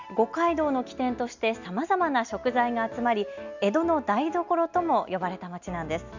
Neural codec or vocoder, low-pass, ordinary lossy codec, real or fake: none; 7.2 kHz; none; real